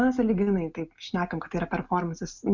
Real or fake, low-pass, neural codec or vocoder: real; 7.2 kHz; none